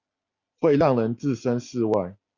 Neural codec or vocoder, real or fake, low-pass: none; real; 7.2 kHz